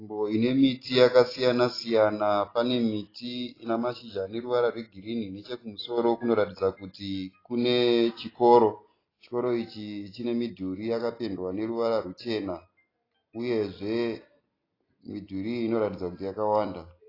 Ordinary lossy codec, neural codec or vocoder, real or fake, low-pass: AAC, 24 kbps; none; real; 5.4 kHz